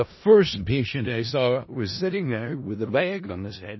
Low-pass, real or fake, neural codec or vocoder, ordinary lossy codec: 7.2 kHz; fake; codec, 16 kHz in and 24 kHz out, 0.4 kbps, LongCat-Audio-Codec, four codebook decoder; MP3, 24 kbps